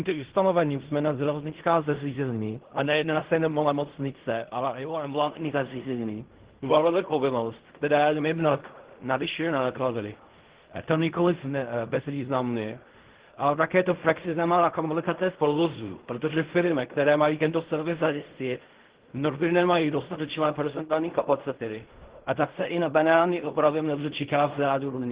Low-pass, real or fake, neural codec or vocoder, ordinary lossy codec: 3.6 kHz; fake; codec, 16 kHz in and 24 kHz out, 0.4 kbps, LongCat-Audio-Codec, fine tuned four codebook decoder; Opus, 16 kbps